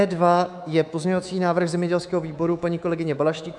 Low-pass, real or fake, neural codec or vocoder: 10.8 kHz; fake; codec, 24 kHz, 3.1 kbps, DualCodec